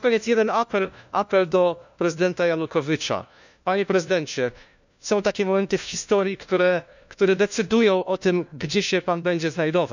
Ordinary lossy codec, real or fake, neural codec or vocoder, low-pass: none; fake; codec, 16 kHz, 1 kbps, FunCodec, trained on LibriTTS, 50 frames a second; 7.2 kHz